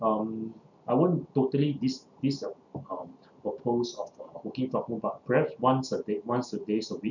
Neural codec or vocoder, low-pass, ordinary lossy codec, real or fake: none; 7.2 kHz; none; real